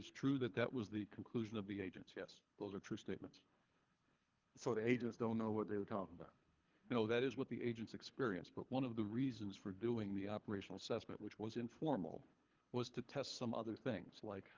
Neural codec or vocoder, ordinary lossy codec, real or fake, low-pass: codec, 24 kHz, 3 kbps, HILCodec; Opus, 32 kbps; fake; 7.2 kHz